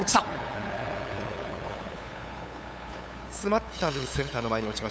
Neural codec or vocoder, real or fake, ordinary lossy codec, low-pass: codec, 16 kHz, 8 kbps, FunCodec, trained on LibriTTS, 25 frames a second; fake; none; none